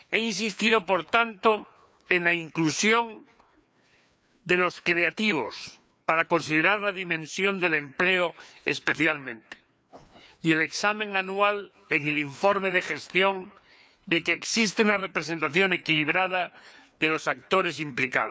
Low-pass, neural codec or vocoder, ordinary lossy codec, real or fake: none; codec, 16 kHz, 2 kbps, FreqCodec, larger model; none; fake